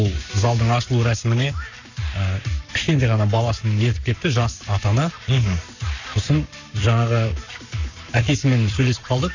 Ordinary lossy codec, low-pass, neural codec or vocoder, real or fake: none; 7.2 kHz; codec, 44.1 kHz, 7.8 kbps, Pupu-Codec; fake